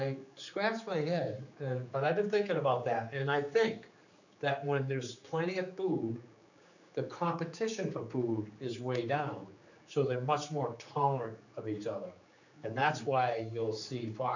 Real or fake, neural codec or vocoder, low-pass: fake; codec, 16 kHz, 4 kbps, X-Codec, HuBERT features, trained on general audio; 7.2 kHz